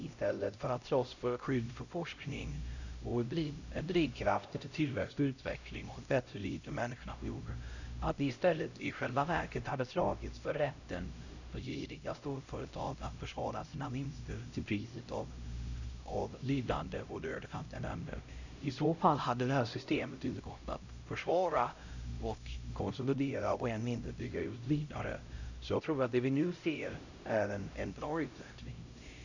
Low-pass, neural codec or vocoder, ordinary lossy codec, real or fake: 7.2 kHz; codec, 16 kHz, 0.5 kbps, X-Codec, HuBERT features, trained on LibriSpeech; none; fake